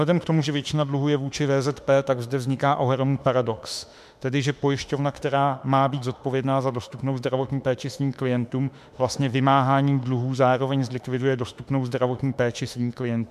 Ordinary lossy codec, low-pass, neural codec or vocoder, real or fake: MP3, 96 kbps; 14.4 kHz; autoencoder, 48 kHz, 32 numbers a frame, DAC-VAE, trained on Japanese speech; fake